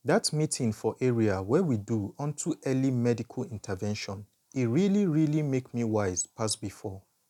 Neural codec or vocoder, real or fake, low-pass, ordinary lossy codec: none; real; 19.8 kHz; none